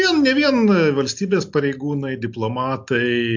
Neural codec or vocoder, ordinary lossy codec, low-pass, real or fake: none; MP3, 48 kbps; 7.2 kHz; real